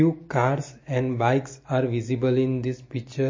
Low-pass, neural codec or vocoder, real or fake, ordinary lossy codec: 7.2 kHz; none; real; MP3, 32 kbps